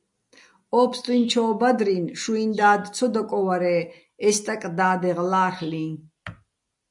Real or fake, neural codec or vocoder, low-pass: real; none; 10.8 kHz